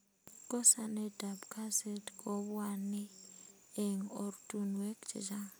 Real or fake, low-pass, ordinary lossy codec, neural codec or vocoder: real; none; none; none